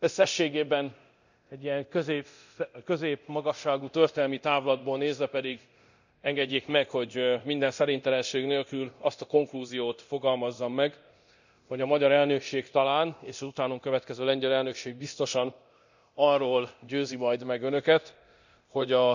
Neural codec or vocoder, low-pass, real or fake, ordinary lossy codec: codec, 24 kHz, 0.9 kbps, DualCodec; 7.2 kHz; fake; none